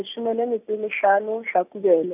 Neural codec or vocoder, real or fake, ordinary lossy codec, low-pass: none; real; none; 3.6 kHz